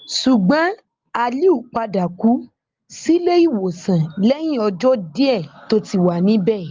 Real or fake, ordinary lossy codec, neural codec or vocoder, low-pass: real; Opus, 32 kbps; none; 7.2 kHz